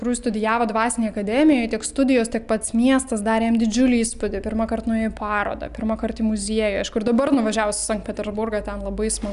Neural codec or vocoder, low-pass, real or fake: none; 10.8 kHz; real